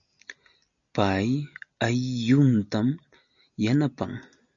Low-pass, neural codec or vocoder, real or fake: 7.2 kHz; none; real